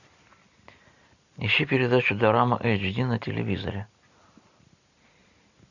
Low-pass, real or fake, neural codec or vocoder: 7.2 kHz; real; none